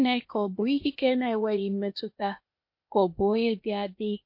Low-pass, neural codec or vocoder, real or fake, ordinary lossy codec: 5.4 kHz; codec, 16 kHz, 0.8 kbps, ZipCodec; fake; MP3, 32 kbps